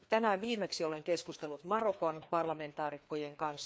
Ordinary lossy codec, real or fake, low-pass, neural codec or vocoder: none; fake; none; codec, 16 kHz, 2 kbps, FreqCodec, larger model